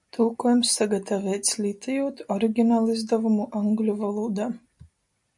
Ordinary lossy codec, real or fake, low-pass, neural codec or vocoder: MP3, 96 kbps; real; 10.8 kHz; none